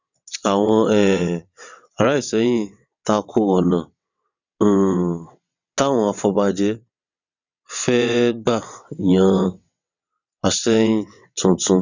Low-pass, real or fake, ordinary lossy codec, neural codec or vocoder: 7.2 kHz; fake; none; vocoder, 22.05 kHz, 80 mel bands, WaveNeXt